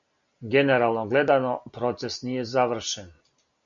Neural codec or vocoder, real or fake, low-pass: none; real; 7.2 kHz